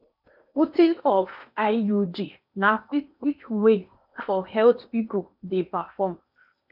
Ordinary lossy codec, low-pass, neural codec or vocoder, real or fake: none; 5.4 kHz; codec, 16 kHz in and 24 kHz out, 0.8 kbps, FocalCodec, streaming, 65536 codes; fake